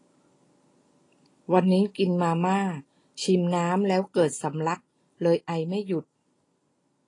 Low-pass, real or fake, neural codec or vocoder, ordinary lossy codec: 10.8 kHz; real; none; AAC, 32 kbps